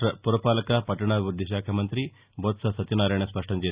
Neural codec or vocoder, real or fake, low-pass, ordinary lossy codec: none; real; 3.6 kHz; Opus, 64 kbps